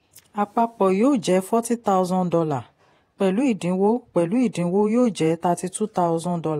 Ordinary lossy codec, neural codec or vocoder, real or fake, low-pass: AAC, 48 kbps; vocoder, 48 kHz, 128 mel bands, Vocos; fake; 19.8 kHz